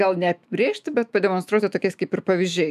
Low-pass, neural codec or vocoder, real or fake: 14.4 kHz; autoencoder, 48 kHz, 128 numbers a frame, DAC-VAE, trained on Japanese speech; fake